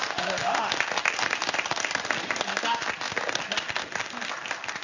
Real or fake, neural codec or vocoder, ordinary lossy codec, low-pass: fake; codec, 16 kHz in and 24 kHz out, 1 kbps, XY-Tokenizer; none; 7.2 kHz